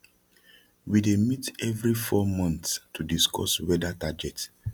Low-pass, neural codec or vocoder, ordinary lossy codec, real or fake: 19.8 kHz; none; none; real